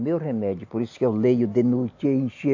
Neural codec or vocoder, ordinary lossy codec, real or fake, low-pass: none; AAC, 48 kbps; real; 7.2 kHz